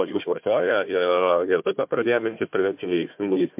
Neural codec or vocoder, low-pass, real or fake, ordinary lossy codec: codec, 16 kHz, 1 kbps, FunCodec, trained on Chinese and English, 50 frames a second; 3.6 kHz; fake; MP3, 32 kbps